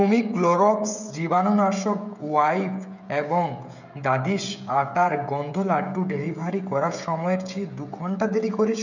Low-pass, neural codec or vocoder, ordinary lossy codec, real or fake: 7.2 kHz; codec, 16 kHz, 16 kbps, FreqCodec, smaller model; none; fake